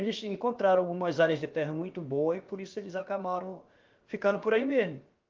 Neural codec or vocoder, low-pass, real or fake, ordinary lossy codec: codec, 16 kHz, about 1 kbps, DyCAST, with the encoder's durations; 7.2 kHz; fake; Opus, 32 kbps